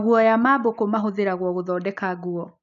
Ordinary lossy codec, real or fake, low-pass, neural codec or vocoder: none; real; 7.2 kHz; none